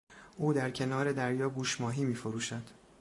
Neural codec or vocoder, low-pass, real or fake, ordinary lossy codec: vocoder, 44.1 kHz, 128 mel bands every 512 samples, BigVGAN v2; 10.8 kHz; fake; AAC, 48 kbps